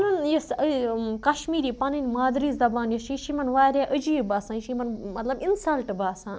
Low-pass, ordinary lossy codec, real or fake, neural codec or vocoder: none; none; real; none